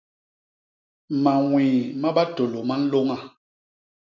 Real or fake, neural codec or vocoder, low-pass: real; none; 7.2 kHz